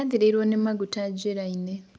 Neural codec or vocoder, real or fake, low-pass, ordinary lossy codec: none; real; none; none